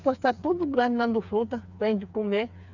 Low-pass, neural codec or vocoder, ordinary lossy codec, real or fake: 7.2 kHz; codec, 16 kHz, 4 kbps, FreqCodec, smaller model; none; fake